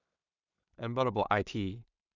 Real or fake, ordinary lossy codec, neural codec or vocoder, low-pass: fake; none; codec, 16 kHz in and 24 kHz out, 0.4 kbps, LongCat-Audio-Codec, two codebook decoder; 7.2 kHz